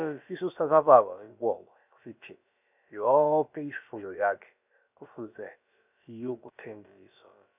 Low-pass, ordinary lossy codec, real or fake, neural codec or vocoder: 3.6 kHz; none; fake; codec, 16 kHz, about 1 kbps, DyCAST, with the encoder's durations